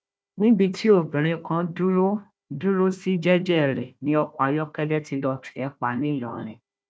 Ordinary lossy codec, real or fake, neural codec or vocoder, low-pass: none; fake; codec, 16 kHz, 1 kbps, FunCodec, trained on Chinese and English, 50 frames a second; none